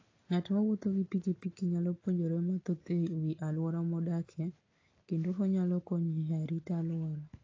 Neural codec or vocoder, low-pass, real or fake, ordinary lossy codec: none; 7.2 kHz; real; AAC, 32 kbps